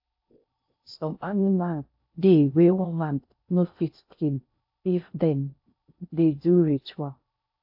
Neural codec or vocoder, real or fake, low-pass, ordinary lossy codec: codec, 16 kHz in and 24 kHz out, 0.6 kbps, FocalCodec, streaming, 4096 codes; fake; 5.4 kHz; AAC, 48 kbps